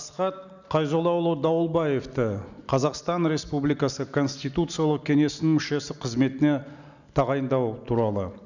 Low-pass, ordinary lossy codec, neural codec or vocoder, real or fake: 7.2 kHz; none; none; real